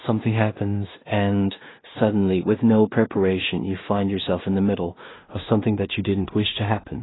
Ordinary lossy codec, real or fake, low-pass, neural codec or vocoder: AAC, 16 kbps; fake; 7.2 kHz; codec, 16 kHz in and 24 kHz out, 0.4 kbps, LongCat-Audio-Codec, two codebook decoder